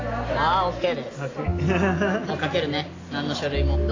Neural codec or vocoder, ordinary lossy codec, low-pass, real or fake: none; AAC, 32 kbps; 7.2 kHz; real